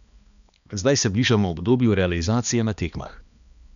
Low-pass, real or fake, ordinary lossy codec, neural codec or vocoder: 7.2 kHz; fake; none; codec, 16 kHz, 2 kbps, X-Codec, HuBERT features, trained on balanced general audio